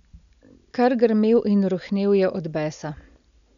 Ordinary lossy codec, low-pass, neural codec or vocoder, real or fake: none; 7.2 kHz; none; real